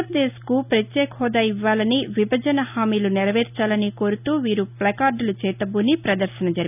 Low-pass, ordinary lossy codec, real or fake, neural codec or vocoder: 3.6 kHz; none; real; none